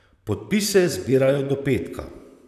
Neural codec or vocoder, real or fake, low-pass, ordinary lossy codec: vocoder, 44.1 kHz, 128 mel bands, Pupu-Vocoder; fake; 14.4 kHz; none